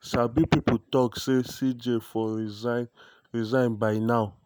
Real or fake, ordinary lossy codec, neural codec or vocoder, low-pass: real; none; none; none